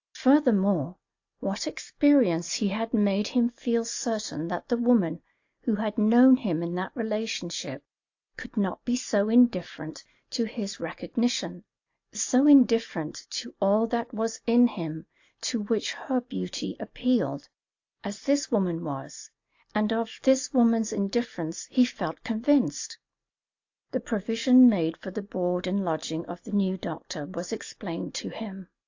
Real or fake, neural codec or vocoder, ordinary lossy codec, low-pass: real; none; AAC, 48 kbps; 7.2 kHz